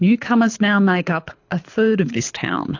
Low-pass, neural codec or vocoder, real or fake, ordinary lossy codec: 7.2 kHz; codec, 24 kHz, 6 kbps, HILCodec; fake; AAC, 48 kbps